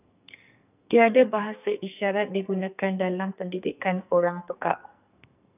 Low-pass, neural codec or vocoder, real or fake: 3.6 kHz; codec, 44.1 kHz, 2.6 kbps, SNAC; fake